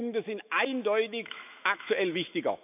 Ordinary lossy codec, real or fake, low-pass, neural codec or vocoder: none; fake; 3.6 kHz; autoencoder, 48 kHz, 128 numbers a frame, DAC-VAE, trained on Japanese speech